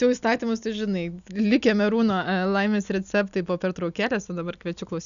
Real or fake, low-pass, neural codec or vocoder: real; 7.2 kHz; none